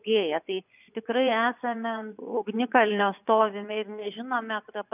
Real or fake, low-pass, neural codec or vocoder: fake; 3.6 kHz; vocoder, 24 kHz, 100 mel bands, Vocos